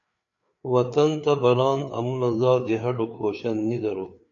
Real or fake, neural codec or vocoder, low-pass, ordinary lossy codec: fake; codec, 16 kHz, 4 kbps, FreqCodec, larger model; 7.2 kHz; AAC, 64 kbps